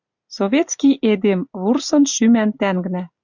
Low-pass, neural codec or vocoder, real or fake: 7.2 kHz; none; real